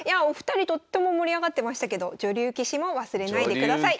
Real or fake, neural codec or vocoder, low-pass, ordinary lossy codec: real; none; none; none